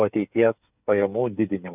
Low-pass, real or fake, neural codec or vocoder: 3.6 kHz; fake; codec, 16 kHz, 8 kbps, FreqCodec, smaller model